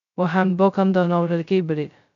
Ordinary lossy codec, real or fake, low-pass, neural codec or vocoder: none; fake; 7.2 kHz; codec, 16 kHz, 0.2 kbps, FocalCodec